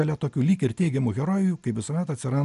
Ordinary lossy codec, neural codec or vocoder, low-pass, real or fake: MP3, 96 kbps; none; 10.8 kHz; real